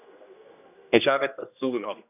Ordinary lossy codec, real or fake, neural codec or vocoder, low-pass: none; fake; codec, 16 kHz, 1 kbps, X-Codec, HuBERT features, trained on general audio; 3.6 kHz